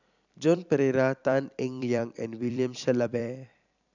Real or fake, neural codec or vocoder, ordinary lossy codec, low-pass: fake; vocoder, 44.1 kHz, 128 mel bands every 256 samples, BigVGAN v2; none; 7.2 kHz